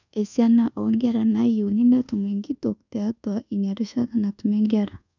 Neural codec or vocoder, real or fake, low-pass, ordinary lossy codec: codec, 24 kHz, 1.2 kbps, DualCodec; fake; 7.2 kHz; none